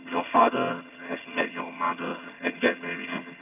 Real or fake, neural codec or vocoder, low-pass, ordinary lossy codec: fake; vocoder, 22.05 kHz, 80 mel bands, HiFi-GAN; 3.6 kHz; none